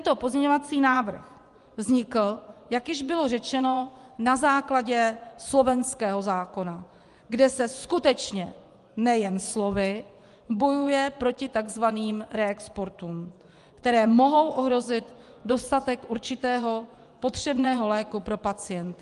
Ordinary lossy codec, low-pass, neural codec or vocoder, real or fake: Opus, 32 kbps; 10.8 kHz; vocoder, 24 kHz, 100 mel bands, Vocos; fake